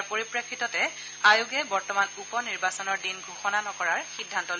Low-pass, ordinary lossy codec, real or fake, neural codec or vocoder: none; none; real; none